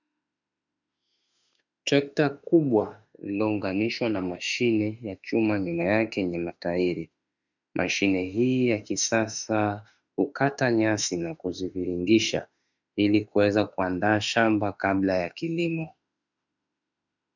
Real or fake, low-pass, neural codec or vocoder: fake; 7.2 kHz; autoencoder, 48 kHz, 32 numbers a frame, DAC-VAE, trained on Japanese speech